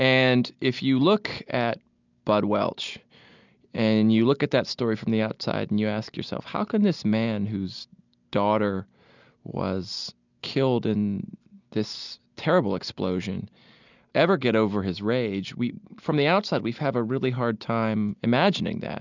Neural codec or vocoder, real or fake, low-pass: none; real; 7.2 kHz